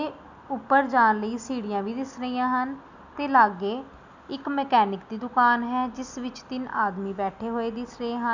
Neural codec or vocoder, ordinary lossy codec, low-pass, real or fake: none; none; 7.2 kHz; real